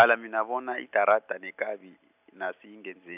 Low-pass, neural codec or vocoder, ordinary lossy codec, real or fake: 3.6 kHz; none; none; real